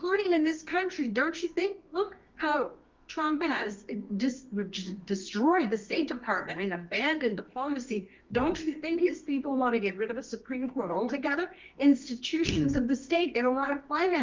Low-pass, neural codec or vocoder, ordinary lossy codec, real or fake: 7.2 kHz; codec, 24 kHz, 0.9 kbps, WavTokenizer, medium music audio release; Opus, 24 kbps; fake